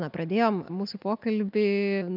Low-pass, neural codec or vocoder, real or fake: 5.4 kHz; none; real